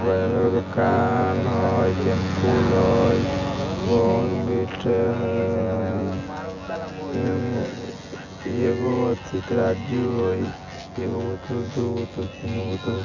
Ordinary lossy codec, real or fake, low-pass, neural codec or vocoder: none; fake; 7.2 kHz; vocoder, 24 kHz, 100 mel bands, Vocos